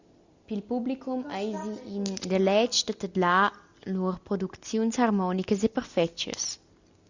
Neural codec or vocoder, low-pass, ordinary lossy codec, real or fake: none; 7.2 kHz; Opus, 64 kbps; real